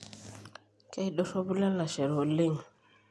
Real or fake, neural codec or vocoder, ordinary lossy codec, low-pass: real; none; none; none